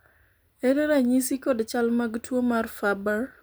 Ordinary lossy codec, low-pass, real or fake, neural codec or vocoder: none; none; real; none